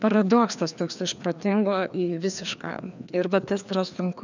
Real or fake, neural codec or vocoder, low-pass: fake; codec, 16 kHz, 2 kbps, FreqCodec, larger model; 7.2 kHz